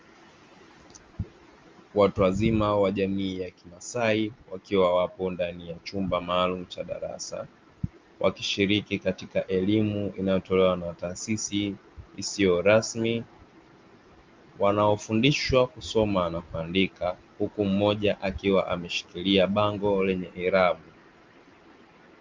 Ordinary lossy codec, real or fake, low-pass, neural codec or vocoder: Opus, 32 kbps; real; 7.2 kHz; none